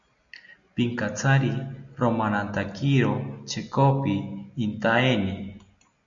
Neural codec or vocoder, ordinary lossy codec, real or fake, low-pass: none; MP3, 64 kbps; real; 7.2 kHz